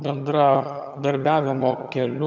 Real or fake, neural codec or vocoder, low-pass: fake; vocoder, 22.05 kHz, 80 mel bands, HiFi-GAN; 7.2 kHz